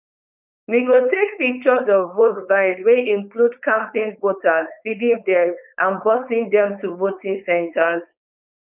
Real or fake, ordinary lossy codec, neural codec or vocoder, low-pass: fake; none; codec, 16 kHz, 4.8 kbps, FACodec; 3.6 kHz